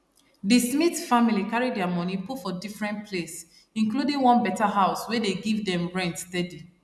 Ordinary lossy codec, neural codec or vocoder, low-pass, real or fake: none; none; none; real